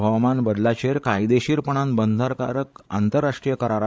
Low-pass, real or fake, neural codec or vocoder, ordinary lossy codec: none; fake; codec, 16 kHz, 16 kbps, FreqCodec, larger model; none